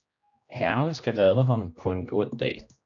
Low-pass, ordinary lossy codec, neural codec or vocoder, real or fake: 7.2 kHz; AAC, 64 kbps; codec, 16 kHz, 1 kbps, X-Codec, HuBERT features, trained on general audio; fake